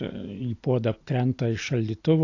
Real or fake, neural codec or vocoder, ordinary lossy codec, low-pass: real; none; AAC, 48 kbps; 7.2 kHz